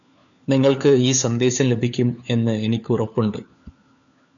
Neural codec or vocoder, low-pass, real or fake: codec, 16 kHz, 4 kbps, FunCodec, trained on LibriTTS, 50 frames a second; 7.2 kHz; fake